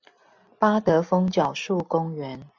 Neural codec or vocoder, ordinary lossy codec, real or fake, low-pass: none; MP3, 64 kbps; real; 7.2 kHz